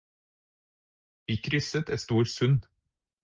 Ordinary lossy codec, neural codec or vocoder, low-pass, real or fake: Opus, 32 kbps; none; 7.2 kHz; real